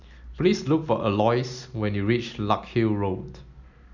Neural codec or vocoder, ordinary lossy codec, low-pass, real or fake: none; none; 7.2 kHz; real